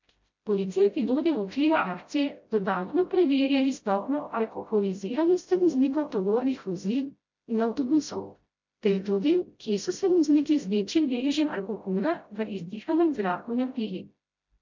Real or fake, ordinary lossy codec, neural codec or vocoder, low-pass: fake; MP3, 48 kbps; codec, 16 kHz, 0.5 kbps, FreqCodec, smaller model; 7.2 kHz